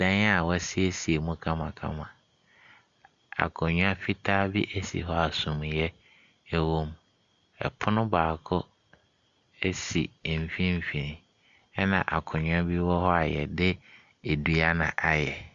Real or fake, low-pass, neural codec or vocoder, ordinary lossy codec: real; 7.2 kHz; none; Opus, 64 kbps